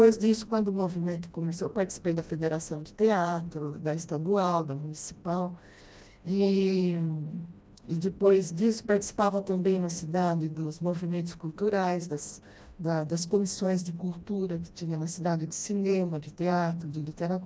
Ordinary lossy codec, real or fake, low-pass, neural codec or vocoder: none; fake; none; codec, 16 kHz, 1 kbps, FreqCodec, smaller model